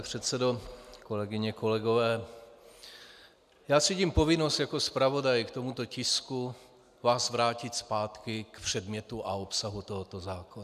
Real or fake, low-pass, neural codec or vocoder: real; 14.4 kHz; none